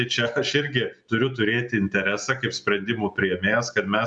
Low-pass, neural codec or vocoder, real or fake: 10.8 kHz; none; real